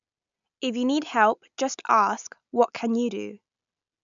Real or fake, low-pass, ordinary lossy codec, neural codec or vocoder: real; 7.2 kHz; none; none